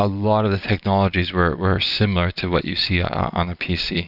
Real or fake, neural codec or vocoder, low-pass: real; none; 5.4 kHz